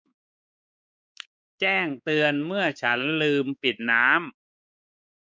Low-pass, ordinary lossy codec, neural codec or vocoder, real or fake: none; none; codec, 16 kHz, 4 kbps, X-Codec, WavLM features, trained on Multilingual LibriSpeech; fake